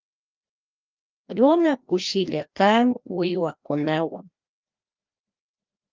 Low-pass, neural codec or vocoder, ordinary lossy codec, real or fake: 7.2 kHz; codec, 16 kHz, 1 kbps, FreqCodec, larger model; Opus, 24 kbps; fake